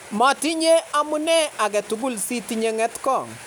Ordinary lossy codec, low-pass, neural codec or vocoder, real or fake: none; none; none; real